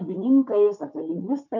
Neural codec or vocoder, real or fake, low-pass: codec, 16 kHz, 4 kbps, FunCodec, trained on Chinese and English, 50 frames a second; fake; 7.2 kHz